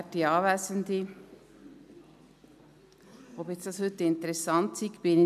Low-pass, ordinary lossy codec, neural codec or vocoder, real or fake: 14.4 kHz; none; none; real